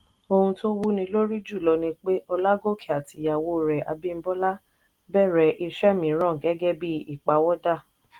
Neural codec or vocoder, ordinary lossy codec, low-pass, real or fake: none; Opus, 24 kbps; 19.8 kHz; real